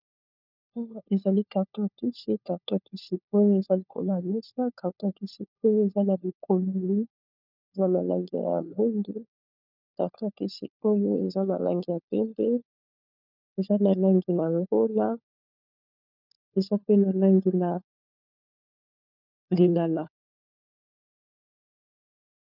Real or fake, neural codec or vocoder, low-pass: fake; codec, 16 kHz, 4 kbps, FunCodec, trained on LibriTTS, 50 frames a second; 5.4 kHz